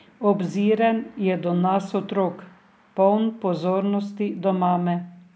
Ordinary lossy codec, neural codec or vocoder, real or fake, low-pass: none; none; real; none